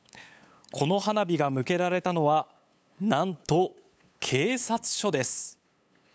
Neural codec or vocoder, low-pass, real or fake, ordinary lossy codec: codec, 16 kHz, 8 kbps, FunCodec, trained on LibriTTS, 25 frames a second; none; fake; none